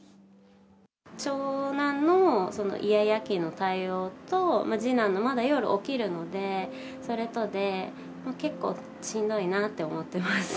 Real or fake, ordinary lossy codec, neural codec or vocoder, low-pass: real; none; none; none